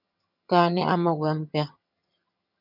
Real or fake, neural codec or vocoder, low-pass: fake; vocoder, 22.05 kHz, 80 mel bands, HiFi-GAN; 5.4 kHz